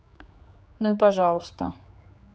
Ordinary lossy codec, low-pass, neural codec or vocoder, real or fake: none; none; codec, 16 kHz, 4 kbps, X-Codec, HuBERT features, trained on general audio; fake